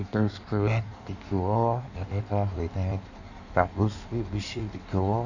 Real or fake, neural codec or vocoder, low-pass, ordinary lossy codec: fake; codec, 16 kHz in and 24 kHz out, 1.1 kbps, FireRedTTS-2 codec; 7.2 kHz; none